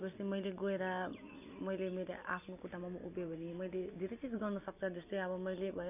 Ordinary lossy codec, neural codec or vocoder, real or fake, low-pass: none; none; real; 3.6 kHz